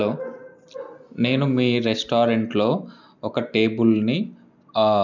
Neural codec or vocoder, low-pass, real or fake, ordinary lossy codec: none; 7.2 kHz; real; none